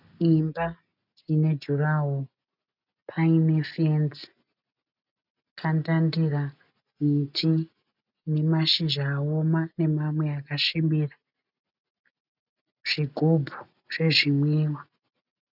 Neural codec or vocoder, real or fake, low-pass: none; real; 5.4 kHz